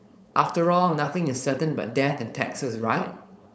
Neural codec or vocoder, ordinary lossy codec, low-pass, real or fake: codec, 16 kHz, 4.8 kbps, FACodec; none; none; fake